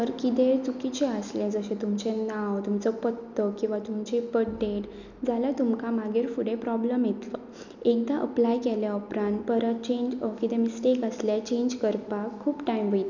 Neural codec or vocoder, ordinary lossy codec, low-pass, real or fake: none; none; 7.2 kHz; real